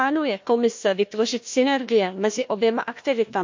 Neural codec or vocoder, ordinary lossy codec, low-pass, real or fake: codec, 16 kHz, 1 kbps, FunCodec, trained on Chinese and English, 50 frames a second; MP3, 48 kbps; 7.2 kHz; fake